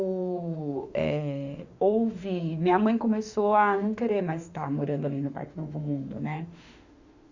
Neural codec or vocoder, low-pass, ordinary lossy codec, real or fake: autoencoder, 48 kHz, 32 numbers a frame, DAC-VAE, trained on Japanese speech; 7.2 kHz; Opus, 64 kbps; fake